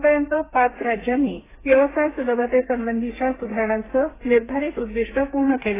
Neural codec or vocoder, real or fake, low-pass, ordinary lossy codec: codec, 32 kHz, 1.9 kbps, SNAC; fake; 3.6 kHz; AAC, 16 kbps